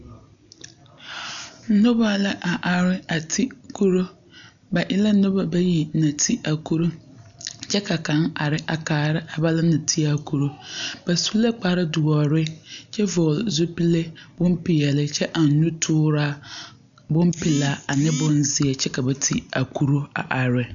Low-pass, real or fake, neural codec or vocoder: 7.2 kHz; real; none